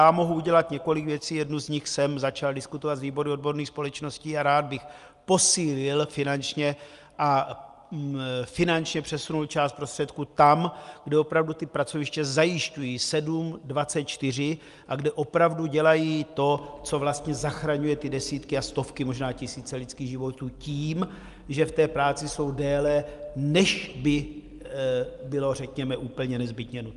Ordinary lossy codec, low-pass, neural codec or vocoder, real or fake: Opus, 32 kbps; 10.8 kHz; none; real